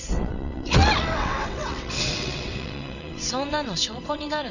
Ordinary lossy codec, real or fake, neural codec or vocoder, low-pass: none; fake; vocoder, 22.05 kHz, 80 mel bands, WaveNeXt; 7.2 kHz